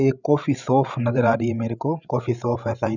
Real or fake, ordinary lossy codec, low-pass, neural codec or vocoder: fake; none; 7.2 kHz; codec, 16 kHz, 16 kbps, FreqCodec, larger model